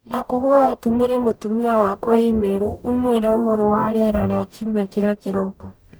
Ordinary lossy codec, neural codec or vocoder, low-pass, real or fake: none; codec, 44.1 kHz, 0.9 kbps, DAC; none; fake